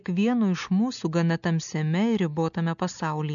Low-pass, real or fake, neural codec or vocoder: 7.2 kHz; real; none